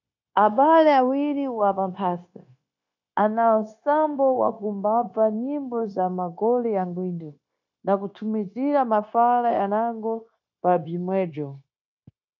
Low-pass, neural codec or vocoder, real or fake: 7.2 kHz; codec, 16 kHz, 0.9 kbps, LongCat-Audio-Codec; fake